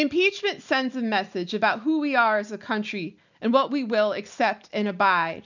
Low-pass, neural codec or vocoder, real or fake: 7.2 kHz; none; real